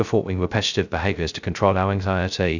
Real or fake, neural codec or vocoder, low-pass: fake; codec, 16 kHz, 0.2 kbps, FocalCodec; 7.2 kHz